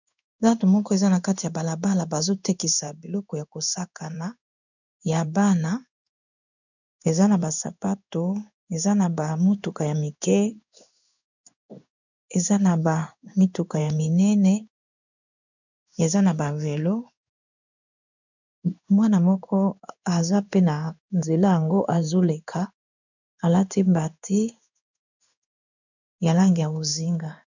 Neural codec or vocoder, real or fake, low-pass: codec, 16 kHz in and 24 kHz out, 1 kbps, XY-Tokenizer; fake; 7.2 kHz